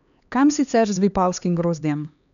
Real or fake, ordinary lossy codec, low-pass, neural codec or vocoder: fake; none; 7.2 kHz; codec, 16 kHz, 2 kbps, X-Codec, HuBERT features, trained on LibriSpeech